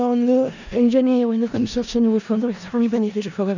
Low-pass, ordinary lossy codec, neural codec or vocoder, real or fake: 7.2 kHz; none; codec, 16 kHz in and 24 kHz out, 0.4 kbps, LongCat-Audio-Codec, four codebook decoder; fake